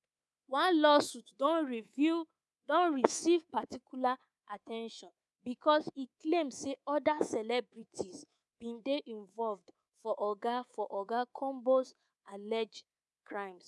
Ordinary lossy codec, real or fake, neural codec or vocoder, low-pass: none; fake; codec, 24 kHz, 3.1 kbps, DualCodec; none